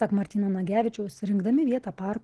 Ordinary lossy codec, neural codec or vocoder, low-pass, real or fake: Opus, 16 kbps; none; 10.8 kHz; real